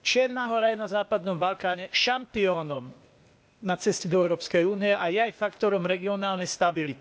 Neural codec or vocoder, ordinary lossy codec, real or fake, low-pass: codec, 16 kHz, 0.8 kbps, ZipCodec; none; fake; none